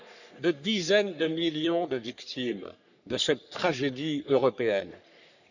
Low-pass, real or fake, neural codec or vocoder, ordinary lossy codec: 7.2 kHz; fake; codec, 44.1 kHz, 3.4 kbps, Pupu-Codec; none